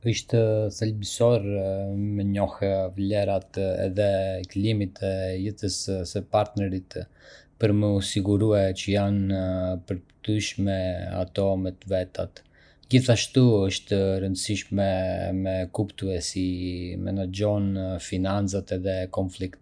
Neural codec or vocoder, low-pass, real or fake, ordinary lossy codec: none; 9.9 kHz; real; none